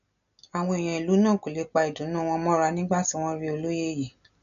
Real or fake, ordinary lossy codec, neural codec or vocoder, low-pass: real; none; none; 7.2 kHz